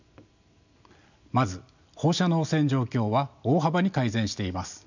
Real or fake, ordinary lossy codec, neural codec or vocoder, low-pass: real; none; none; 7.2 kHz